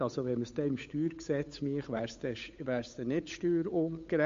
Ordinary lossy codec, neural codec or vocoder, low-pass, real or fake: MP3, 48 kbps; codec, 16 kHz, 8 kbps, FunCodec, trained on Chinese and English, 25 frames a second; 7.2 kHz; fake